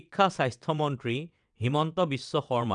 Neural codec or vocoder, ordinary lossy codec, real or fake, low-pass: vocoder, 22.05 kHz, 80 mel bands, WaveNeXt; none; fake; 9.9 kHz